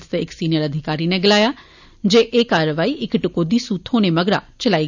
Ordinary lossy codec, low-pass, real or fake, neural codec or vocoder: none; 7.2 kHz; real; none